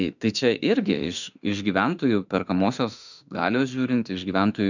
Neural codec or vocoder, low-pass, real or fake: autoencoder, 48 kHz, 32 numbers a frame, DAC-VAE, trained on Japanese speech; 7.2 kHz; fake